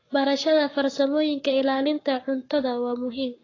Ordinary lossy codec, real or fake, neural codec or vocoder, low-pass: AAC, 32 kbps; fake; codec, 44.1 kHz, 7.8 kbps, Pupu-Codec; 7.2 kHz